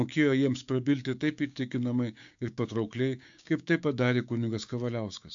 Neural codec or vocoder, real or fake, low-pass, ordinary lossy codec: codec, 16 kHz, 6 kbps, DAC; fake; 7.2 kHz; AAC, 64 kbps